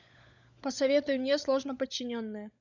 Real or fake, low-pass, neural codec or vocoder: fake; 7.2 kHz; codec, 16 kHz, 16 kbps, FunCodec, trained on LibriTTS, 50 frames a second